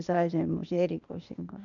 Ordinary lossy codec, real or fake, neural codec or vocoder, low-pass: none; fake; codec, 16 kHz, 0.8 kbps, ZipCodec; 7.2 kHz